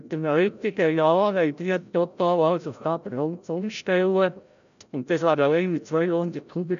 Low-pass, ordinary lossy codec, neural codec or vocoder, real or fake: 7.2 kHz; none; codec, 16 kHz, 0.5 kbps, FreqCodec, larger model; fake